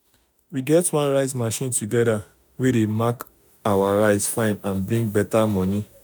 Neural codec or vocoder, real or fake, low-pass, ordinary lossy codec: autoencoder, 48 kHz, 32 numbers a frame, DAC-VAE, trained on Japanese speech; fake; none; none